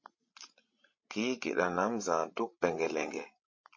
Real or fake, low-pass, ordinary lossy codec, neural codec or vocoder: fake; 7.2 kHz; MP3, 32 kbps; codec, 16 kHz, 16 kbps, FreqCodec, larger model